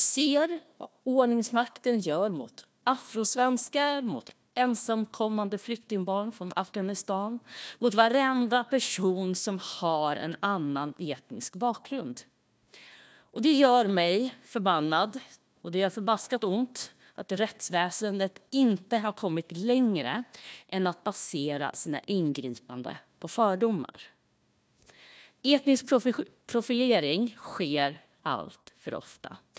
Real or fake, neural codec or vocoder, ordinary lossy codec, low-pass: fake; codec, 16 kHz, 1 kbps, FunCodec, trained on Chinese and English, 50 frames a second; none; none